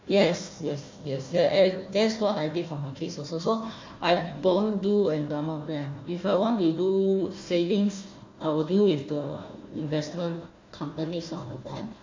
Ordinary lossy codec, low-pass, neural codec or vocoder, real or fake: MP3, 48 kbps; 7.2 kHz; codec, 16 kHz, 1 kbps, FunCodec, trained on Chinese and English, 50 frames a second; fake